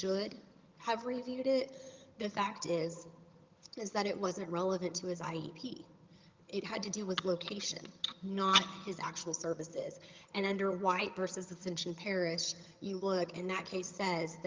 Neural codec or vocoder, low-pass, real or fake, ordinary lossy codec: vocoder, 22.05 kHz, 80 mel bands, HiFi-GAN; 7.2 kHz; fake; Opus, 32 kbps